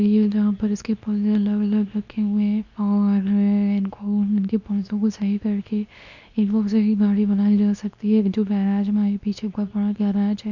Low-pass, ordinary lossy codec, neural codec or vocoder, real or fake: 7.2 kHz; none; codec, 24 kHz, 0.9 kbps, WavTokenizer, medium speech release version 2; fake